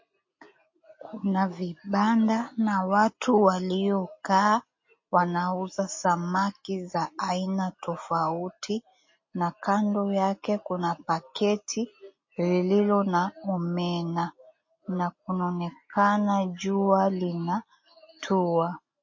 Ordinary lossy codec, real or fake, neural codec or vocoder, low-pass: MP3, 32 kbps; real; none; 7.2 kHz